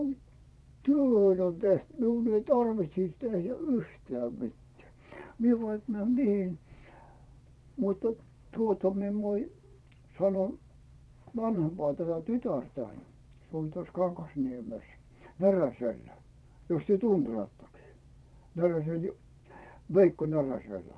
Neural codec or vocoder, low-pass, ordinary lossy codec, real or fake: vocoder, 22.05 kHz, 80 mel bands, Vocos; none; none; fake